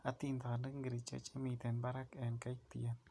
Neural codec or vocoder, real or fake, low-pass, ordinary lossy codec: none; real; none; none